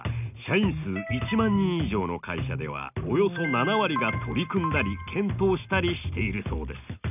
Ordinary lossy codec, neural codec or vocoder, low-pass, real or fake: none; none; 3.6 kHz; real